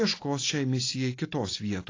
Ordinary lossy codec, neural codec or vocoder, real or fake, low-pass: AAC, 32 kbps; none; real; 7.2 kHz